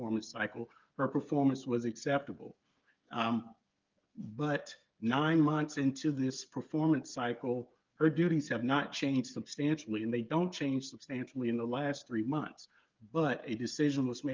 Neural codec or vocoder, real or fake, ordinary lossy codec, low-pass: codec, 16 kHz, 8 kbps, FreqCodec, smaller model; fake; Opus, 32 kbps; 7.2 kHz